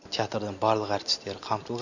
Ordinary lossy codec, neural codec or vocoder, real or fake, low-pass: none; none; real; 7.2 kHz